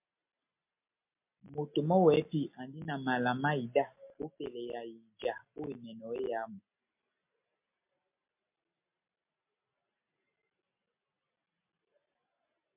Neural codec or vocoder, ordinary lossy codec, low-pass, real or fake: none; MP3, 32 kbps; 3.6 kHz; real